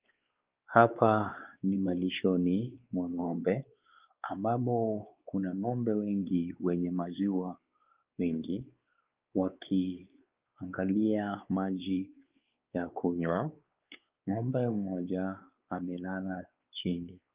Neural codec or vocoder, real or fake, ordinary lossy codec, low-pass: codec, 16 kHz, 4 kbps, X-Codec, WavLM features, trained on Multilingual LibriSpeech; fake; Opus, 24 kbps; 3.6 kHz